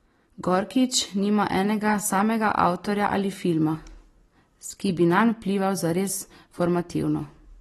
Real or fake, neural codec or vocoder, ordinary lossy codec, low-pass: real; none; AAC, 32 kbps; 14.4 kHz